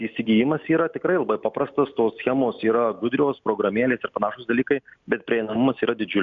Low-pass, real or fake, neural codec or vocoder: 7.2 kHz; real; none